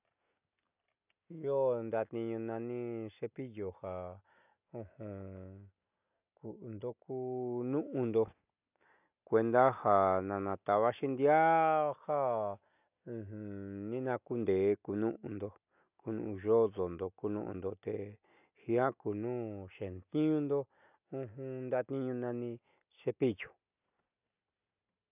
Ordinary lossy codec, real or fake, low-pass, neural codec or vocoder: none; real; 3.6 kHz; none